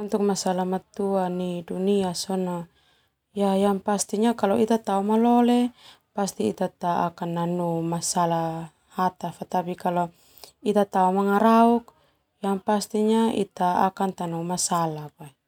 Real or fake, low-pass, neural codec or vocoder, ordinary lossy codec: real; 19.8 kHz; none; none